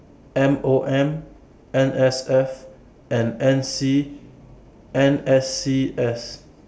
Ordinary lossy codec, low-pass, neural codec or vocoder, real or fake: none; none; none; real